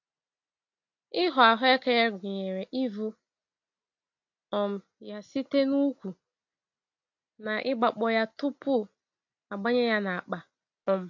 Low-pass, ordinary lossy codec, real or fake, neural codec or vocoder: 7.2 kHz; AAC, 48 kbps; real; none